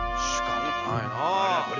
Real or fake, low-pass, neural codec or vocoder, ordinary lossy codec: real; 7.2 kHz; none; none